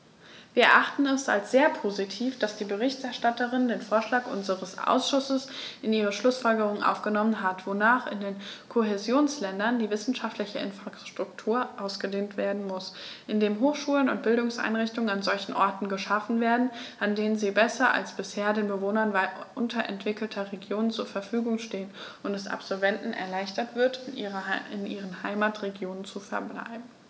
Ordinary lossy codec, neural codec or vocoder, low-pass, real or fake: none; none; none; real